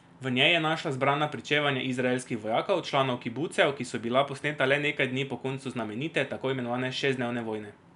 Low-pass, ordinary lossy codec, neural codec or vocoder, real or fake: 10.8 kHz; none; none; real